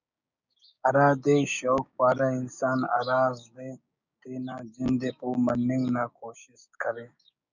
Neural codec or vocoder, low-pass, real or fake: codec, 16 kHz, 6 kbps, DAC; 7.2 kHz; fake